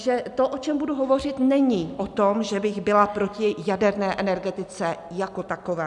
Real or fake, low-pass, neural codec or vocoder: real; 10.8 kHz; none